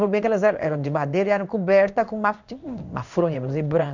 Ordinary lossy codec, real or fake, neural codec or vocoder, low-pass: none; fake; codec, 16 kHz in and 24 kHz out, 1 kbps, XY-Tokenizer; 7.2 kHz